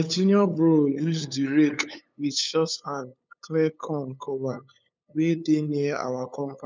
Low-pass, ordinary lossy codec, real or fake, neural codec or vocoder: none; none; fake; codec, 16 kHz, 8 kbps, FunCodec, trained on LibriTTS, 25 frames a second